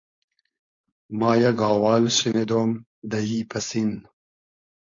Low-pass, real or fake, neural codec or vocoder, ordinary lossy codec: 7.2 kHz; fake; codec, 16 kHz, 4.8 kbps, FACodec; MP3, 48 kbps